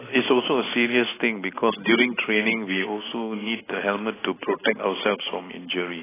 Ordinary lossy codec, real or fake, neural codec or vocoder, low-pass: AAC, 16 kbps; real; none; 3.6 kHz